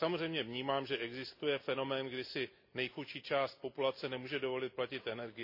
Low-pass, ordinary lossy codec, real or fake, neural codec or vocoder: 5.4 kHz; MP3, 32 kbps; real; none